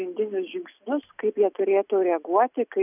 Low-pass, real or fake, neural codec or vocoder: 3.6 kHz; real; none